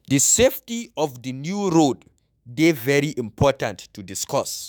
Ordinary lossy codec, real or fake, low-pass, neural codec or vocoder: none; fake; none; autoencoder, 48 kHz, 128 numbers a frame, DAC-VAE, trained on Japanese speech